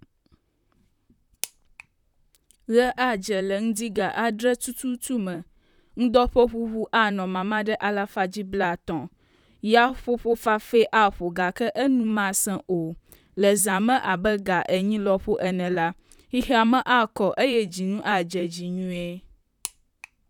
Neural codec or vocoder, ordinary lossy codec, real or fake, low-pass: vocoder, 44.1 kHz, 128 mel bands, Pupu-Vocoder; none; fake; 19.8 kHz